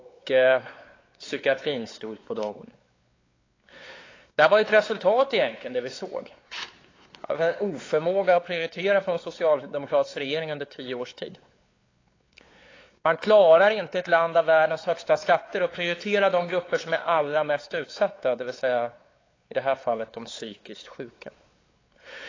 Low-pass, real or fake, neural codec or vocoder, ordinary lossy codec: 7.2 kHz; fake; codec, 16 kHz, 4 kbps, X-Codec, WavLM features, trained on Multilingual LibriSpeech; AAC, 32 kbps